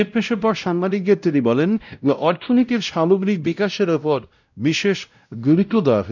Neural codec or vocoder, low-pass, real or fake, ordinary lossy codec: codec, 16 kHz, 0.5 kbps, X-Codec, WavLM features, trained on Multilingual LibriSpeech; 7.2 kHz; fake; none